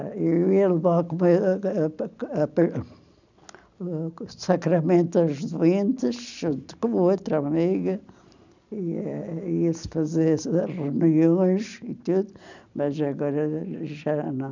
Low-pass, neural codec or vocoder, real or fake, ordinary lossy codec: 7.2 kHz; none; real; none